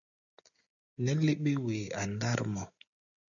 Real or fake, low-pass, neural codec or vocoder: real; 7.2 kHz; none